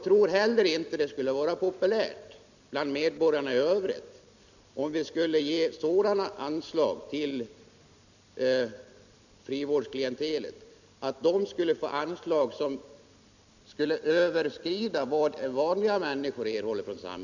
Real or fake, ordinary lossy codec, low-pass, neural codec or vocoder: real; none; 7.2 kHz; none